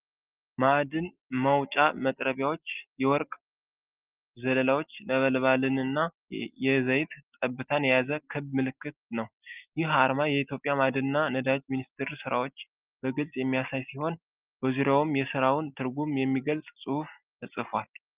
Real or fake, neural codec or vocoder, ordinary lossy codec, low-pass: real; none; Opus, 32 kbps; 3.6 kHz